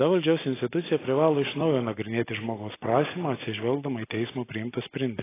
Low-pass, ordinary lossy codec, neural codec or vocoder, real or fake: 3.6 kHz; AAC, 16 kbps; none; real